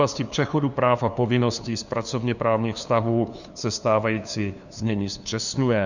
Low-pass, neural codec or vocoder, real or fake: 7.2 kHz; codec, 16 kHz, 2 kbps, FunCodec, trained on LibriTTS, 25 frames a second; fake